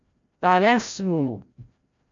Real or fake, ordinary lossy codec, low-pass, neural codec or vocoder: fake; MP3, 48 kbps; 7.2 kHz; codec, 16 kHz, 0.5 kbps, FreqCodec, larger model